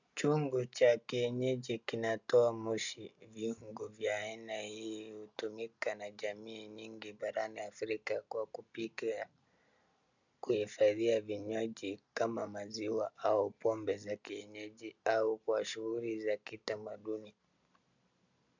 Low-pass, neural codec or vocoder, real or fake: 7.2 kHz; none; real